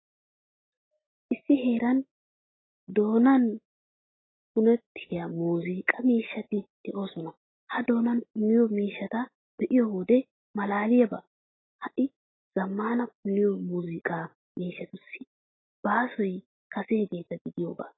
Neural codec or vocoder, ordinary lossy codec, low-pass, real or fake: none; AAC, 16 kbps; 7.2 kHz; real